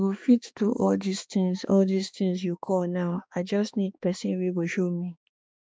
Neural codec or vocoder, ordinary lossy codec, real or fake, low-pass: codec, 16 kHz, 2 kbps, X-Codec, HuBERT features, trained on balanced general audio; none; fake; none